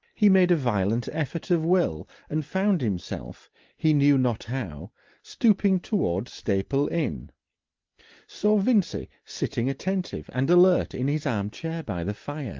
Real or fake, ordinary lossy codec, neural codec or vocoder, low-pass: real; Opus, 24 kbps; none; 7.2 kHz